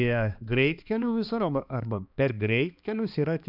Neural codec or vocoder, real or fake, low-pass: codec, 16 kHz, 2 kbps, X-Codec, HuBERT features, trained on balanced general audio; fake; 5.4 kHz